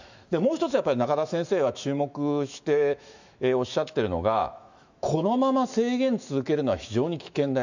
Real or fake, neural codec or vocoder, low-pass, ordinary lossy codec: fake; autoencoder, 48 kHz, 128 numbers a frame, DAC-VAE, trained on Japanese speech; 7.2 kHz; none